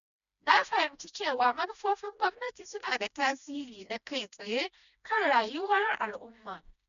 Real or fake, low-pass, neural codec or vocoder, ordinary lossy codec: fake; 7.2 kHz; codec, 16 kHz, 1 kbps, FreqCodec, smaller model; none